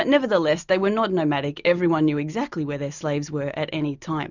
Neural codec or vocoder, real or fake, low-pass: none; real; 7.2 kHz